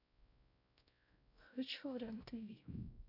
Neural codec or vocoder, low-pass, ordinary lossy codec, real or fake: codec, 16 kHz, 0.5 kbps, X-Codec, WavLM features, trained on Multilingual LibriSpeech; 5.4 kHz; none; fake